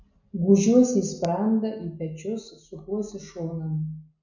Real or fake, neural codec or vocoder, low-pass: real; none; 7.2 kHz